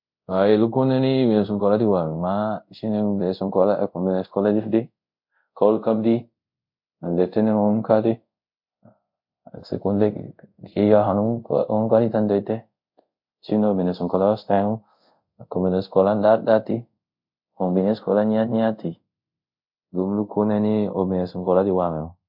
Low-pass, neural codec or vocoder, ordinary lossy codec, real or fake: 5.4 kHz; codec, 24 kHz, 0.5 kbps, DualCodec; MP3, 48 kbps; fake